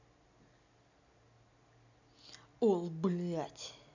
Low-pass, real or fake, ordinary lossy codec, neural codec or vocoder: 7.2 kHz; real; none; none